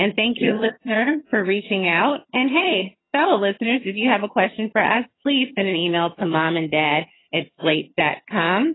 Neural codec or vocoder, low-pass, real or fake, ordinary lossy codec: vocoder, 22.05 kHz, 80 mel bands, HiFi-GAN; 7.2 kHz; fake; AAC, 16 kbps